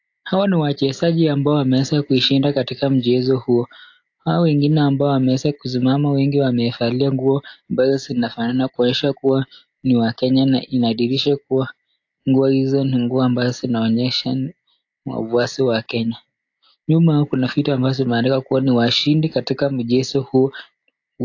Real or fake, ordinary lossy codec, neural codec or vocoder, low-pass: real; AAC, 48 kbps; none; 7.2 kHz